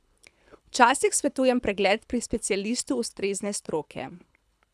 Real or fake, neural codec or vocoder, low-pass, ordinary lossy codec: fake; codec, 24 kHz, 6 kbps, HILCodec; none; none